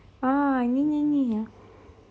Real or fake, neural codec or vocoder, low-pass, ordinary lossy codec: real; none; none; none